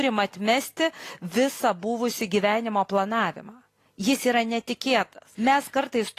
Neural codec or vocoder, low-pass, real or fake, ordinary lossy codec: none; 14.4 kHz; real; AAC, 48 kbps